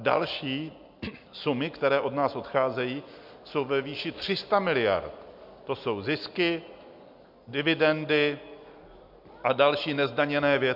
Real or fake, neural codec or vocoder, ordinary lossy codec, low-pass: real; none; MP3, 48 kbps; 5.4 kHz